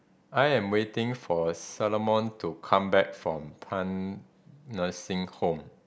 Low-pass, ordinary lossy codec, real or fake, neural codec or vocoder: none; none; real; none